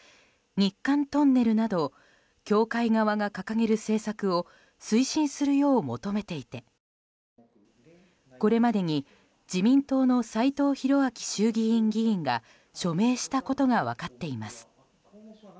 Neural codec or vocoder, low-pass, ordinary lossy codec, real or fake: none; none; none; real